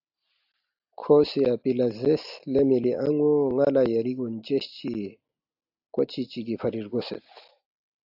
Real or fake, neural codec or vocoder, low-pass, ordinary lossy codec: real; none; 5.4 kHz; AAC, 48 kbps